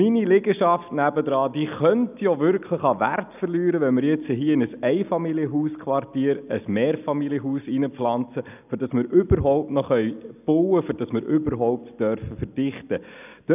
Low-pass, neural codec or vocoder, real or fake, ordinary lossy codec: 3.6 kHz; none; real; none